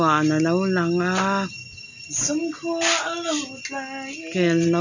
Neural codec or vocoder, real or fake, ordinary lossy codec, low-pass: none; real; none; 7.2 kHz